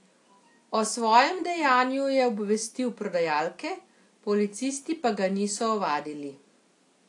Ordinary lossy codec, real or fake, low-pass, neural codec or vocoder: AAC, 48 kbps; real; 10.8 kHz; none